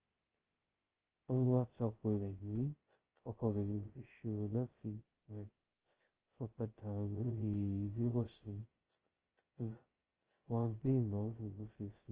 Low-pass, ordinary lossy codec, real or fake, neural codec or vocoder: 3.6 kHz; Opus, 16 kbps; fake; codec, 16 kHz, 0.2 kbps, FocalCodec